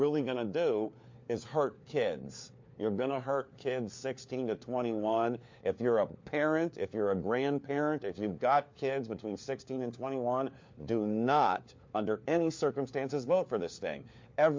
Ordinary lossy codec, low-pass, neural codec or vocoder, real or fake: MP3, 48 kbps; 7.2 kHz; codec, 16 kHz, 4 kbps, FunCodec, trained on LibriTTS, 50 frames a second; fake